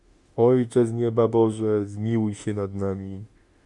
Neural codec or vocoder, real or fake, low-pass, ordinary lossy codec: autoencoder, 48 kHz, 32 numbers a frame, DAC-VAE, trained on Japanese speech; fake; 10.8 kHz; Opus, 64 kbps